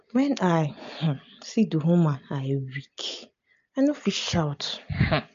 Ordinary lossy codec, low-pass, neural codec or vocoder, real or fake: MP3, 48 kbps; 7.2 kHz; none; real